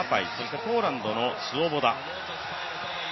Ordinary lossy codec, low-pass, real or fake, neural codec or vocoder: MP3, 24 kbps; 7.2 kHz; real; none